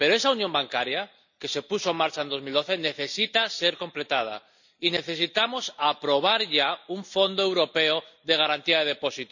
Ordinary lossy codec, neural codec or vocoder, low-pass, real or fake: none; none; 7.2 kHz; real